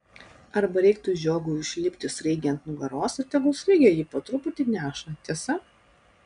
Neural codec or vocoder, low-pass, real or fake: none; 9.9 kHz; real